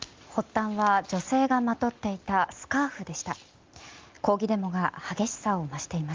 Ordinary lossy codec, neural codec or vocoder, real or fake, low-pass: Opus, 32 kbps; none; real; 7.2 kHz